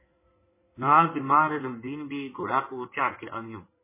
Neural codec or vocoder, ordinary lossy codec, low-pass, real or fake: codec, 16 kHz in and 24 kHz out, 2.2 kbps, FireRedTTS-2 codec; MP3, 16 kbps; 3.6 kHz; fake